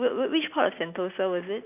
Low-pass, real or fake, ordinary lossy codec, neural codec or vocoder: 3.6 kHz; real; none; none